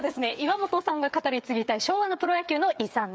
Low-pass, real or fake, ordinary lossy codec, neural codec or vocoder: none; fake; none; codec, 16 kHz, 8 kbps, FreqCodec, smaller model